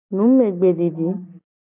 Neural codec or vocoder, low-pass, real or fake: none; 3.6 kHz; real